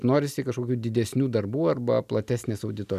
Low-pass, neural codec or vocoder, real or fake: 14.4 kHz; none; real